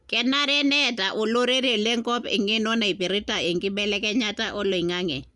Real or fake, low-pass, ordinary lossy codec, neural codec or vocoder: real; 10.8 kHz; Opus, 64 kbps; none